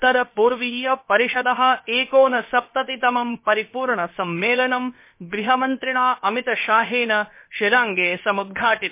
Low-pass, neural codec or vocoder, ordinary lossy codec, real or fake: 3.6 kHz; codec, 16 kHz, about 1 kbps, DyCAST, with the encoder's durations; MP3, 24 kbps; fake